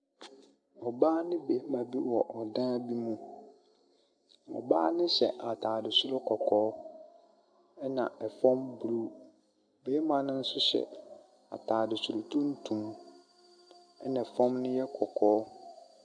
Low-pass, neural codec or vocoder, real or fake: 9.9 kHz; none; real